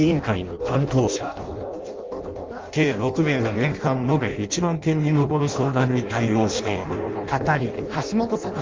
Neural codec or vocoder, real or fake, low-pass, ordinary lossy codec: codec, 16 kHz in and 24 kHz out, 0.6 kbps, FireRedTTS-2 codec; fake; 7.2 kHz; Opus, 32 kbps